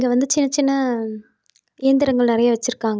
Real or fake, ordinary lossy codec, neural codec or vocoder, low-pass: real; none; none; none